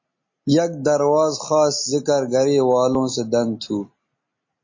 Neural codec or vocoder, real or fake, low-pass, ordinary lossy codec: none; real; 7.2 kHz; MP3, 32 kbps